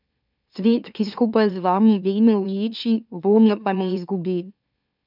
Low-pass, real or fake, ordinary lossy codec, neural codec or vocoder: 5.4 kHz; fake; none; autoencoder, 44.1 kHz, a latent of 192 numbers a frame, MeloTTS